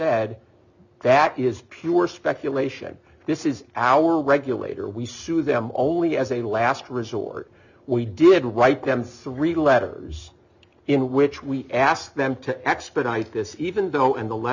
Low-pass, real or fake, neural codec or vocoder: 7.2 kHz; real; none